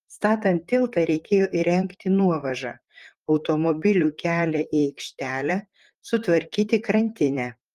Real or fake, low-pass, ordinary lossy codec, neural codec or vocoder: fake; 14.4 kHz; Opus, 32 kbps; vocoder, 44.1 kHz, 128 mel bands, Pupu-Vocoder